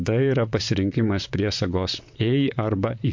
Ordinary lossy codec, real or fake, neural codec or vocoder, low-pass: MP3, 48 kbps; fake; vocoder, 44.1 kHz, 128 mel bands, Pupu-Vocoder; 7.2 kHz